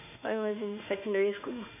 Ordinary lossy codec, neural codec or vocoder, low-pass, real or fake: none; autoencoder, 48 kHz, 32 numbers a frame, DAC-VAE, trained on Japanese speech; 3.6 kHz; fake